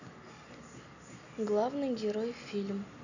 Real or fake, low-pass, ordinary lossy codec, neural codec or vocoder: real; 7.2 kHz; none; none